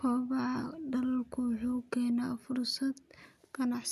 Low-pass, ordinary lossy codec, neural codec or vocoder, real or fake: 14.4 kHz; none; none; real